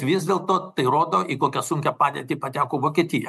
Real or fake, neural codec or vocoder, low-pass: real; none; 14.4 kHz